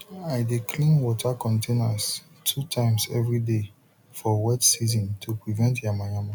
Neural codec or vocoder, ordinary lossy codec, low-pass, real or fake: none; none; 19.8 kHz; real